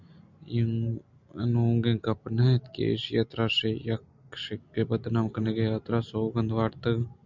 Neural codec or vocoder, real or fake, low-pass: none; real; 7.2 kHz